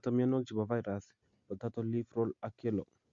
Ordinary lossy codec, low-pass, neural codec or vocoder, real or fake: Opus, 64 kbps; 7.2 kHz; none; real